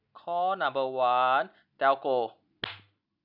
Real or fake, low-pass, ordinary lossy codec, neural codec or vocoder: real; 5.4 kHz; none; none